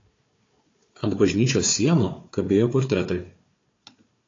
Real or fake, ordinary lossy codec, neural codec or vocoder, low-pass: fake; AAC, 32 kbps; codec, 16 kHz, 4 kbps, FunCodec, trained on Chinese and English, 50 frames a second; 7.2 kHz